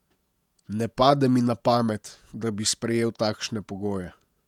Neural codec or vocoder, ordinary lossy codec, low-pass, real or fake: vocoder, 48 kHz, 128 mel bands, Vocos; none; 19.8 kHz; fake